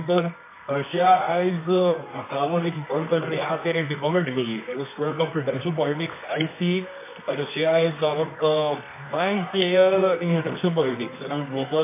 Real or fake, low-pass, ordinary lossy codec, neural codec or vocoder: fake; 3.6 kHz; MP3, 24 kbps; codec, 24 kHz, 0.9 kbps, WavTokenizer, medium music audio release